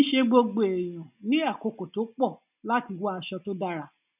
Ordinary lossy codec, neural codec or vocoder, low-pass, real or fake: none; none; 3.6 kHz; real